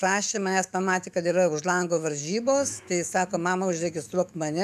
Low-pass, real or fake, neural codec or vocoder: 14.4 kHz; fake; autoencoder, 48 kHz, 128 numbers a frame, DAC-VAE, trained on Japanese speech